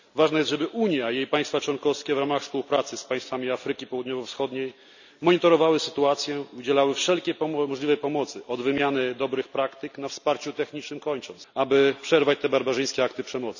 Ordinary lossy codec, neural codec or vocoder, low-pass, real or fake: none; none; 7.2 kHz; real